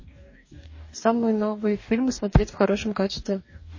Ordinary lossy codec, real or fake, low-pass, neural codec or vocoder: MP3, 32 kbps; fake; 7.2 kHz; codec, 44.1 kHz, 2.6 kbps, DAC